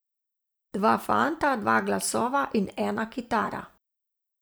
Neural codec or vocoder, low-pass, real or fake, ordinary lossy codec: vocoder, 44.1 kHz, 128 mel bands every 512 samples, BigVGAN v2; none; fake; none